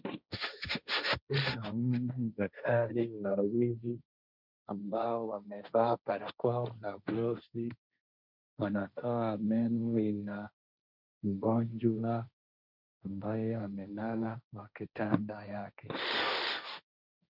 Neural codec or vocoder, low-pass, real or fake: codec, 16 kHz, 1.1 kbps, Voila-Tokenizer; 5.4 kHz; fake